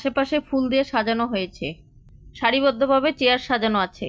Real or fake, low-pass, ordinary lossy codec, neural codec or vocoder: real; none; none; none